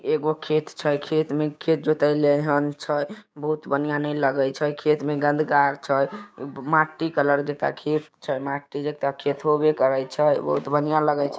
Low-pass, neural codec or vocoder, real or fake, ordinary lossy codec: none; codec, 16 kHz, 6 kbps, DAC; fake; none